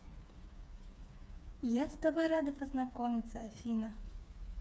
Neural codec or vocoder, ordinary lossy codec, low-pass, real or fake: codec, 16 kHz, 4 kbps, FreqCodec, smaller model; none; none; fake